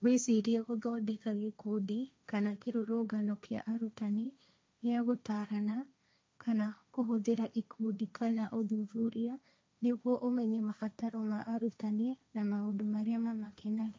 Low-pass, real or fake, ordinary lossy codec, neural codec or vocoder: none; fake; none; codec, 16 kHz, 1.1 kbps, Voila-Tokenizer